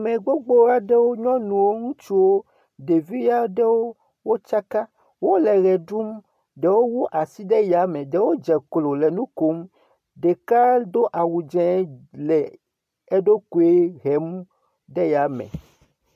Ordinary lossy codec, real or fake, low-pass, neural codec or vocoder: MP3, 64 kbps; real; 14.4 kHz; none